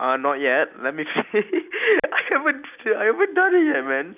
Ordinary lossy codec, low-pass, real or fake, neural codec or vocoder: none; 3.6 kHz; real; none